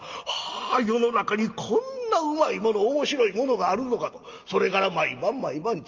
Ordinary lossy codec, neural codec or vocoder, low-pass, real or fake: Opus, 32 kbps; none; 7.2 kHz; real